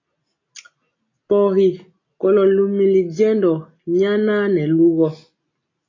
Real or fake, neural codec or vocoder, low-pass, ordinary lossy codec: real; none; 7.2 kHz; AAC, 32 kbps